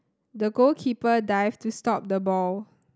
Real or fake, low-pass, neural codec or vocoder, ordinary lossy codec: real; none; none; none